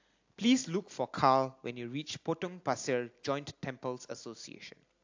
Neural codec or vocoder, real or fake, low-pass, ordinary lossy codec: none; real; 7.2 kHz; AAC, 48 kbps